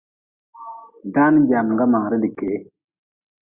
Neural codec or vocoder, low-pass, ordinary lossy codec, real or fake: none; 3.6 kHz; Opus, 64 kbps; real